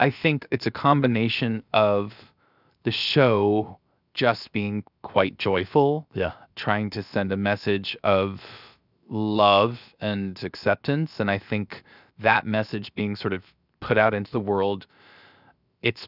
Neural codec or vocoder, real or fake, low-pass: codec, 16 kHz, 0.7 kbps, FocalCodec; fake; 5.4 kHz